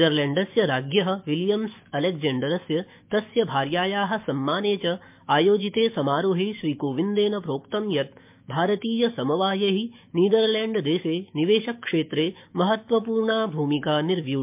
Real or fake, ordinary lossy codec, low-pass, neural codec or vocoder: fake; MP3, 32 kbps; 3.6 kHz; autoencoder, 48 kHz, 128 numbers a frame, DAC-VAE, trained on Japanese speech